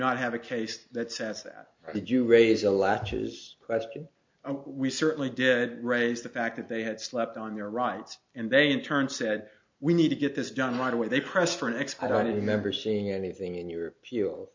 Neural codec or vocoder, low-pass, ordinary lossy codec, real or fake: none; 7.2 kHz; MP3, 48 kbps; real